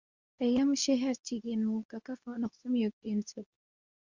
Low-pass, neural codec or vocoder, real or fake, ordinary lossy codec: 7.2 kHz; codec, 24 kHz, 0.9 kbps, WavTokenizer, medium speech release version 2; fake; Opus, 64 kbps